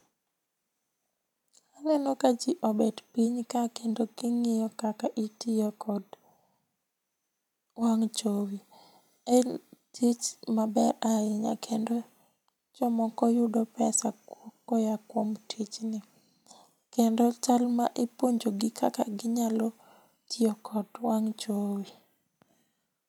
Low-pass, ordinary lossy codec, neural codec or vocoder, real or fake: 19.8 kHz; none; none; real